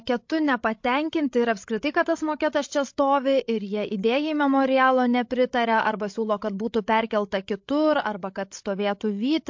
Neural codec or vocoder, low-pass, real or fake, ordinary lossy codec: codec, 16 kHz, 16 kbps, FreqCodec, larger model; 7.2 kHz; fake; MP3, 48 kbps